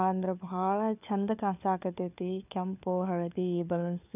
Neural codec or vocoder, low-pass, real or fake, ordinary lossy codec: codec, 16 kHz, 4 kbps, FunCodec, trained on LibriTTS, 50 frames a second; 3.6 kHz; fake; none